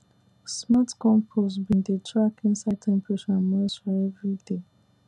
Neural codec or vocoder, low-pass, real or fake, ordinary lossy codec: none; none; real; none